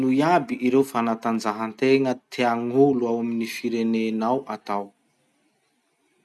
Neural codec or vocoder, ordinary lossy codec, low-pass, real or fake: none; none; none; real